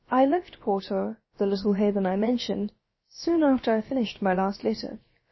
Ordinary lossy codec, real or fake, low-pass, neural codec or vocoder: MP3, 24 kbps; fake; 7.2 kHz; codec, 16 kHz, about 1 kbps, DyCAST, with the encoder's durations